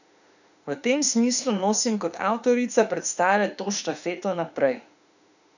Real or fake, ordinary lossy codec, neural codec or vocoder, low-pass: fake; none; autoencoder, 48 kHz, 32 numbers a frame, DAC-VAE, trained on Japanese speech; 7.2 kHz